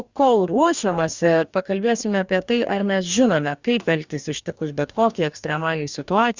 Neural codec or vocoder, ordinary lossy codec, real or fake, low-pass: codec, 44.1 kHz, 2.6 kbps, DAC; Opus, 64 kbps; fake; 7.2 kHz